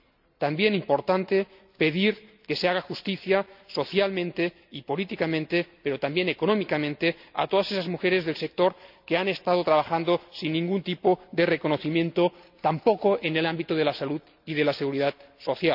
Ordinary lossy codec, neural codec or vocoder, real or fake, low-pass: none; none; real; 5.4 kHz